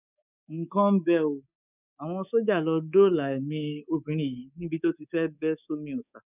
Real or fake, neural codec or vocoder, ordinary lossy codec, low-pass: fake; codec, 24 kHz, 3.1 kbps, DualCodec; none; 3.6 kHz